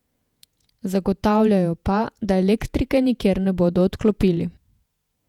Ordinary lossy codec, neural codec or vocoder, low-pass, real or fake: none; vocoder, 48 kHz, 128 mel bands, Vocos; 19.8 kHz; fake